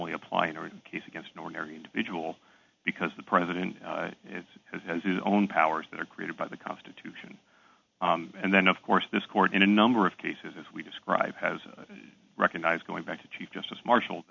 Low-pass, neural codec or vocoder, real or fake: 7.2 kHz; none; real